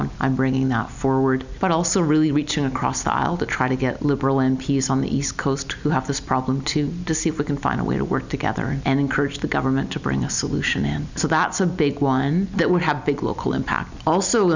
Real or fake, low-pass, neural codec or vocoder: real; 7.2 kHz; none